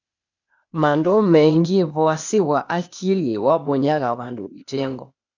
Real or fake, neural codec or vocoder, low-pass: fake; codec, 16 kHz, 0.8 kbps, ZipCodec; 7.2 kHz